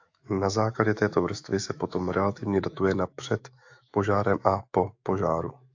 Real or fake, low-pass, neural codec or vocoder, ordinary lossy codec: fake; 7.2 kHz; autoencoder, 48 kHz, 128 numbers a frame, DAC-VAE, trained on Japanese speech; AAC, 48 kbps